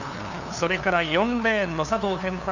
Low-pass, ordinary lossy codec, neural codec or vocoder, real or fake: 7.2 kHz; none; codec, 16 kHz, 2 kbps, FunCodec, trained on LibriTTS, 25 frames a second; fake